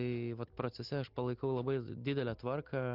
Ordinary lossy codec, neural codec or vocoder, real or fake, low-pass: Opus, 32 kbps; none; real; 5.4 kHz